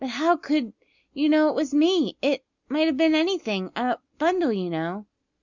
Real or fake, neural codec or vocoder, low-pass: real; none; 7.2 kHz